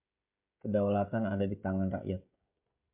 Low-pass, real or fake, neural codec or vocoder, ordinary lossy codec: 3.6 kHz; fake; codec, 16 kHz, 16 kbps, FreqCodec, smaller model; MP3, 32 kbps